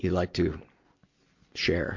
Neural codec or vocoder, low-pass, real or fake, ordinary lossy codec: none; 7.2 kHz; real; MP3, 48 kbps